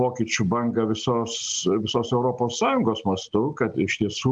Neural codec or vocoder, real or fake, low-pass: none; real; 9.9 kHz